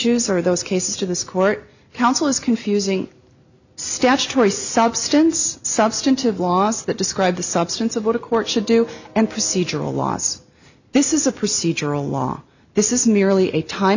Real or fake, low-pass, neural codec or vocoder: real; 7.2 kHz; none